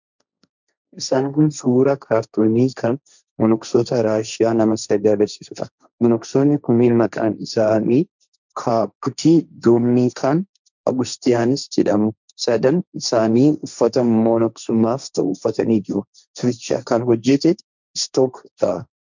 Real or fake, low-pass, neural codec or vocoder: fake; 7.2 kHz; codec, 16 kHz, 1.1 kbps, Voila-Tokenizer